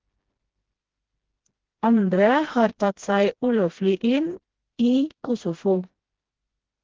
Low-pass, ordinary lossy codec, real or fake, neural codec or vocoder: 7.2 kHz; Opus, 16 kbps; fake; codec, 16 kHz, 1 kbps, FreqCodec, smaller model